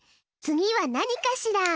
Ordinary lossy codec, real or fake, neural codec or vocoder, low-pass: none; real; none; none